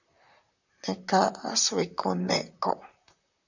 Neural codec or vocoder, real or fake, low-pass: vocoder, 44.1 kHz, 128 mel bands, Pupu-Vocoder; fake; 7.2 kHz